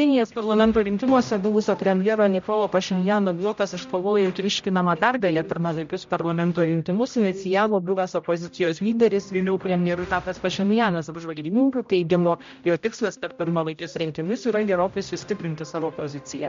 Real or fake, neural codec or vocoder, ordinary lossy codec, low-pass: fake; codec, 16 kHz, 0.5 kbps, X-Codec, HuBERT features, trained on general audio; MP3, 48 kbps; 7.2 kHz